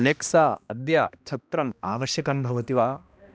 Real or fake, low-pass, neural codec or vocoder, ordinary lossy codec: fake; none; codec, 16 kHz, 1 kbps, X-Codec, HuBERT features, trained on balanced general audio; none